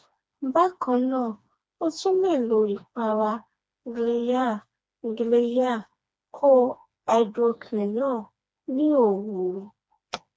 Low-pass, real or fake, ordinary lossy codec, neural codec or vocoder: none; fake; none; codec, 16 kHz, 2 kbps, FreqCodec, smaller model